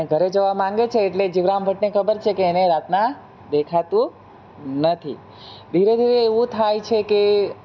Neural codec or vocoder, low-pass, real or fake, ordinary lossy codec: none; none; real; none